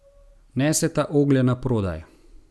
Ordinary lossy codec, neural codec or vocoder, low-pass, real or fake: none; none; none; real